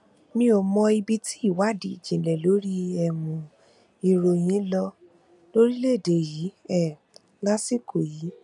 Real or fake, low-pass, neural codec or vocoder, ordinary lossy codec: real; 10.8 kHz; none; none